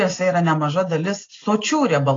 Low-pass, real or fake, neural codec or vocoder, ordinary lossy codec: 7.2 kHz; real; none; AAC, 32 kbps